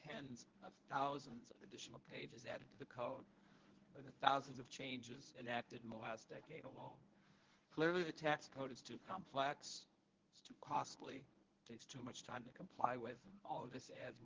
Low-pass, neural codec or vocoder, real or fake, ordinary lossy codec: 7.2 kHz; codec, 24 kHz, 0.9 kbps, WavTokenizer, medium speech release version 1; fake; Opus, 16 kbps